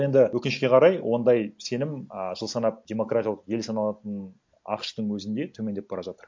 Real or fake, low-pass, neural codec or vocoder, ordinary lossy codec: real; 7.2 kHz; none; MP3, 48 kbps